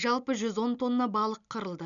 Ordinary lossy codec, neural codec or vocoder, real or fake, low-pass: none; none; real; 7.2 kHz